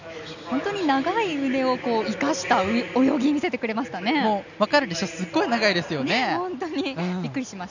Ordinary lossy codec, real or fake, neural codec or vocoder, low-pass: none; real; none; 7.2 kHz